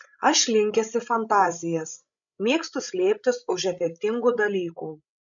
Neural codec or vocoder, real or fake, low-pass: codec, 16 kHz, 16 kbps, FreqCodec, larger model; fake; 7.2 kHz